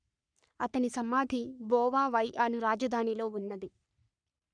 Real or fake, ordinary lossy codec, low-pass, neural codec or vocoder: fake; none; 9.9 kHz; codec, 44.1 kHz, 3.4 kbps, Pupu-Codec